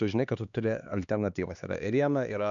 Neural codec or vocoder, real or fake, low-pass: codec, 16 kHz, 2 kbps, X-Codec, HuBERT features, trained on LibriSpeech; fake; 7.2 kHz